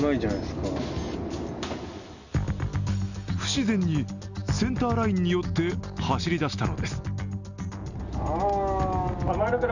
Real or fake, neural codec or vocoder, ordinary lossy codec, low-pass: real; none; none; 7.2 kHz